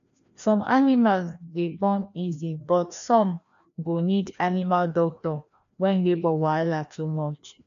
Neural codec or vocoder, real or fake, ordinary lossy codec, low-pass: codec, 16 kHz, 1 kbps, FreqCodec, larger model; fake; MP3, 96 kbps; 7.2 kHz